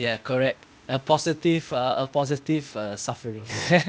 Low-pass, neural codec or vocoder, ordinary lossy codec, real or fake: none; codec, 16 kHz, 0.8 kbps, ZipCodec; none; fake